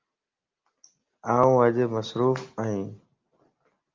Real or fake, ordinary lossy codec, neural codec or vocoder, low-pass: real; Opus, 24 kbps; none; 7.2 kHz